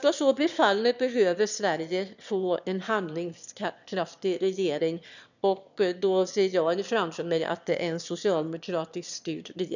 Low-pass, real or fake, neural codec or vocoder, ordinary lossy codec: 7.2 kHz; fake; autoencoder, 22.05 kHz, a latent of 192 numbers a frame, VITS, trained on one speaker; none